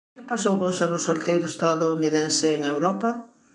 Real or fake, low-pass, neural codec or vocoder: fake; 10.8 kHz; codec, 32 kHz, 1.9 kbps, SNAC